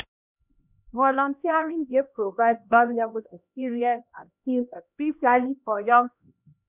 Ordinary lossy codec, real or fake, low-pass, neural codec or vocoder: none; fake; 3.6 kHz; codec, 16 kHz, 1 kbps, X-Codec, HuBERT features, trained on LibriSpeech